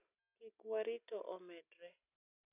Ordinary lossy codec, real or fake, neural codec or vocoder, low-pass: none; real; none; 3.6 kHz